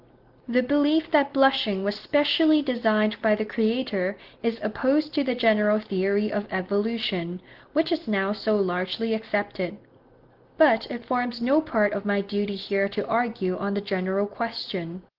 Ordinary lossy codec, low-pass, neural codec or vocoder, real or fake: Opus, 16 kbps; 5.4 kHz; none; real